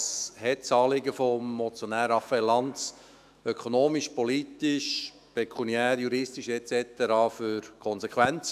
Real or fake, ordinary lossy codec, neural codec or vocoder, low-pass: fake; none; autoencoder, 48 kHz, 128 numbers a frame, DAC-VAE, trained on Japanese speech; 14.4 kHz